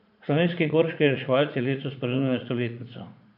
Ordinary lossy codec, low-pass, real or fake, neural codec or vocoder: none; 5.4 kHz; fake; vocoder, 44.1 kHz, 80 mel bands, Vocos